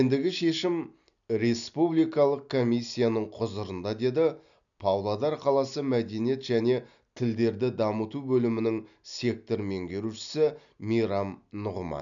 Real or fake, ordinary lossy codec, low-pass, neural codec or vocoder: real; none; 7.2 kHz; none